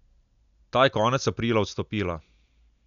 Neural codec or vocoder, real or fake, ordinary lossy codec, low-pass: none; real; none; 7.2 kHz